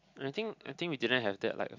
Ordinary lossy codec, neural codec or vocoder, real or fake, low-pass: MP3, 64 kbps; none; real; 7.2 kHz